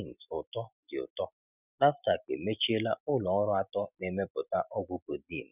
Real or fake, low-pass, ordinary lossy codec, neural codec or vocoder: real; 3.6 kHz; none; none